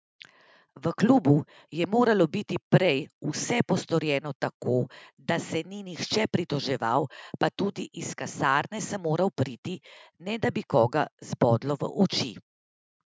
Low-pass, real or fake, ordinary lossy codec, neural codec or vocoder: none; real; none; none